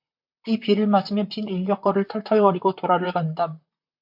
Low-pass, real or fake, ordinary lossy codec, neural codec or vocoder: 5.4 kHz; fake; MP3, 48 kbps; vocoder, 44.1 kHz, 128 mel bands, Pupu-Vocoder